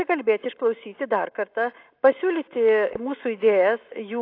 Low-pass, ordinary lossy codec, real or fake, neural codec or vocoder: 5.4 kHz; AAC, 32 kbps; real; none